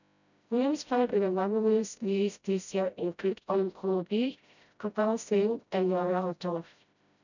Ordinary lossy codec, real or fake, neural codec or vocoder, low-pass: none; fake; codec, 16 kHz, 0.5 kbps, FreqCodec, smaller model; 7.2 kHz